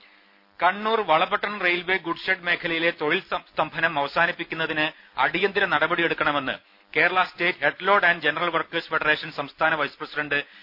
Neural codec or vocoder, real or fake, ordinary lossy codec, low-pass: none; real; none; 5.4 kHz